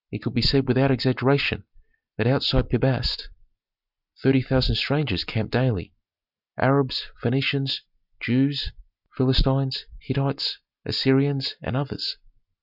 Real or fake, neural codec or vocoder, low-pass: real; none; 5.4 kHz